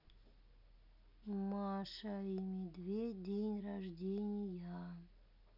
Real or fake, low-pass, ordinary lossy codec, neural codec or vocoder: real; 5.4 kHz; none; none